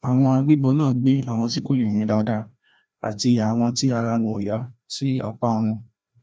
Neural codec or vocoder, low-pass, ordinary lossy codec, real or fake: codec, 16 kHz, 1 kbps, FreqCodec, larger model; none; none; fake